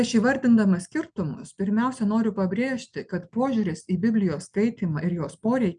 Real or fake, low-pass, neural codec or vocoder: real; 9.9 kHz; none